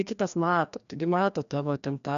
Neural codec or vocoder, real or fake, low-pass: codec, 16 kHz, 1 kbps, FreqCodec, larger model; fake; 7.2 kHz